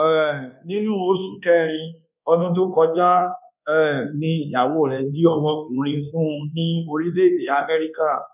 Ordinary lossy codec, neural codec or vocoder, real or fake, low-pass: none; codec, 24 kHz, 1.2 kbps, DualCodec; fake; 3.6 kHz